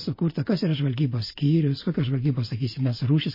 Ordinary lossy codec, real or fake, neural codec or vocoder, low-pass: MP3, 24 kbps; real; none; 5.4 kHz